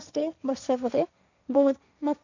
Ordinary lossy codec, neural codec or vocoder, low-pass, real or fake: none; codec, 16 kHz, 1.1 kbps, Voila-Tokenizer; 7.2 kHz; fake